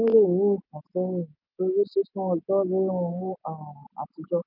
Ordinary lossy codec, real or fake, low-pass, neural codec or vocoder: none; real; 5.4 kHz; none